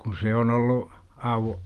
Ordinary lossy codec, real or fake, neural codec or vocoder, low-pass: Opus, 24 kbps; real; none; 14.4 kHz